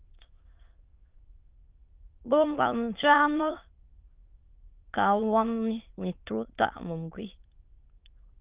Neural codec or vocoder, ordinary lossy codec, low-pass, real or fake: autoencoder, 22.05 kHz, a latent of 192 numbers a frame, VITS, trained on many speakers; Opus, 24 kbps; 3.6 kHz; fake